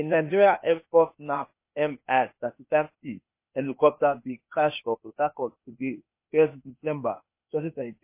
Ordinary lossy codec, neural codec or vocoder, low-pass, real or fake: MP3, 24 kbps; codec, 16 kHz, 0.8 kbps, ZipCodec; 3.6 kHz; fake